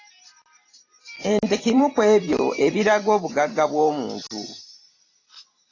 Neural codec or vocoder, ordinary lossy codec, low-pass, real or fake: vocoder, 44.1 kHz, 128 mel bands every 512 samples, BigVGAN v2; AAC, 32 kbps; 7.2 kHz; fake